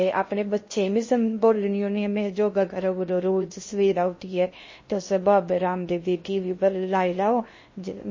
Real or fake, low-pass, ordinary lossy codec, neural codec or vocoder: fake; 7.2 kHz; MP3, 32 kbps; codec, 16 kHz in and 24 kHz out, 0.6 kbps, FocalCodec, streaming, 2048 codes